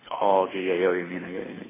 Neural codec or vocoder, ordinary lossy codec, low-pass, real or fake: codec, 24 kHz, 6 kbps, HILCodec; MP3, 16 kbps; 3.6 kHz; fake